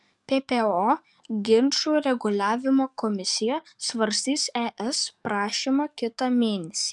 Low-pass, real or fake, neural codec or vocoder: 10.8 kHz; fake; codec, 44.1 kHz, 7.8 kbps, DAC